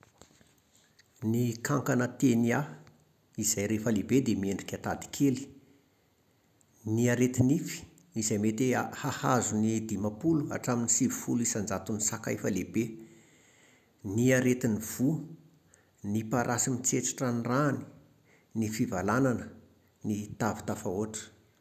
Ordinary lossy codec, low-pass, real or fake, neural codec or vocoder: none; 14.4 kHz; real; none